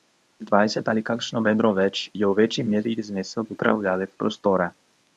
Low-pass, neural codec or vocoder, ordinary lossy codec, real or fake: none; codec, 24 kHz, 0.9 kbps, WavTokenizer, medium speech release version 1; none; fake